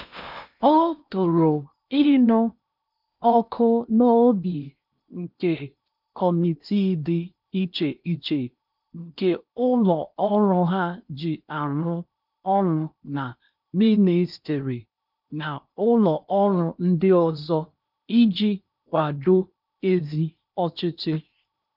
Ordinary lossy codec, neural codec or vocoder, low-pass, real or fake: none; codec, 16 kHz in and 24 kHz out, 0.8 kbps, FocalCodec, streaming, 65536 codes; 5.4 kHz; fake